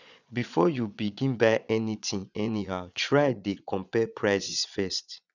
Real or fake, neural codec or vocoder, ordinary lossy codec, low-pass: fake; vocoder, 22.05 kHz, 80 mel bands, Vocos; none; 7.2 kHz